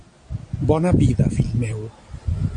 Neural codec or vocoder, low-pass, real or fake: none; 9.9 kHz; real